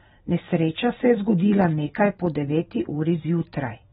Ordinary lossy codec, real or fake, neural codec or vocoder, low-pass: AAC, 16 kbps; real; none; 7.2 kHz